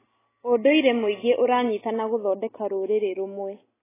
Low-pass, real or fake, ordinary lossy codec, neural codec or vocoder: 3.6 kHz; real; AAC, 16 kbps; none